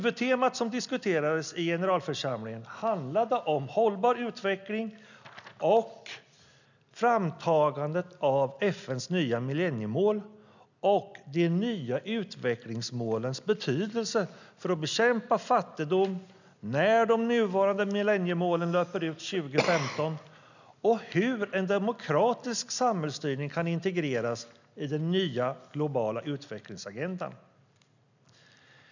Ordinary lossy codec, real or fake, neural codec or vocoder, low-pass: none; real; none; 7.2 kHz